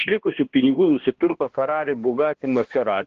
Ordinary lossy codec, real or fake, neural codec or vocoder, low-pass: Opus, 16 kbps; fake; codec, 16 kHz, 1 kbps, X-Codec, HuBERT features, trained on balanced general audio; 7.2 kHz